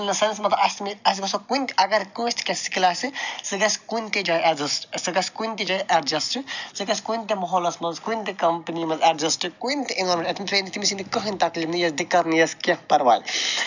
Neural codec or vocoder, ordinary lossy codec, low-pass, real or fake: none; none; 7.2 kHz; real